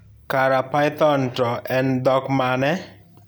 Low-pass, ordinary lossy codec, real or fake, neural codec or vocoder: none; none; real; none